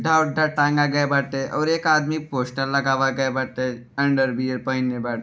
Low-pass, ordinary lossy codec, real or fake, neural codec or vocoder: none; none; real; none